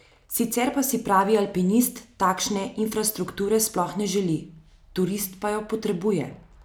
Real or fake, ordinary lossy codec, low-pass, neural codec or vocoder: real; none; none; none